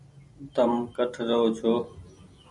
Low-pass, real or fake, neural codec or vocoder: 10.8 kHz; real; none